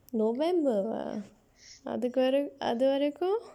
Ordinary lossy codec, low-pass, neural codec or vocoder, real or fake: none; 19.8 kHz; none; real